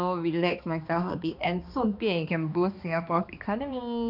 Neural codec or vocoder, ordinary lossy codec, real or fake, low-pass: codec, 16 kHz, 2 kbps, X-Codec, HuBERT features, trained on balanced general audio; none; fake; 5.4 kHz